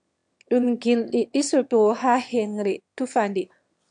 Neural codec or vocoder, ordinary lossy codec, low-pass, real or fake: autoencoder, 22.05 kHz, a latent of 192 numbers a frame, VITS, trained on one speaker; MP3, 64 kbps; 9.9 kHz; fake